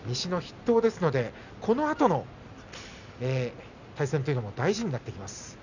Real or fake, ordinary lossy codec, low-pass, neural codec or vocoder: real; none; 7.2 kHz; none